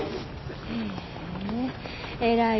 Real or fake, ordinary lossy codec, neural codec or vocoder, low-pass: real; MP3, 24 kbps; none; 7.2 kHz